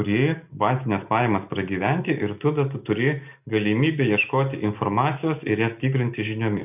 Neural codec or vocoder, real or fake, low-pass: none; real; 3.6 kHz